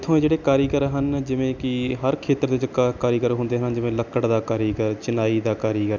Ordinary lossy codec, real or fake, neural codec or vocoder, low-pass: none; real; none; 7.2 kHz